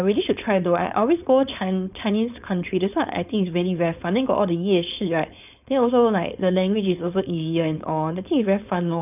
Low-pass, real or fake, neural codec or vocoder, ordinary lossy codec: 3.6 kHz; fake; codec, 16 kHz, 4.8 kbps, FACodec; none